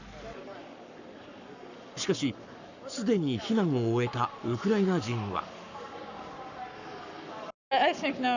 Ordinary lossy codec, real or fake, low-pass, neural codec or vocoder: none; fake; 7.2 kHz; codec, 44.1 kHz, 7.8 kbps, Pupu-Codec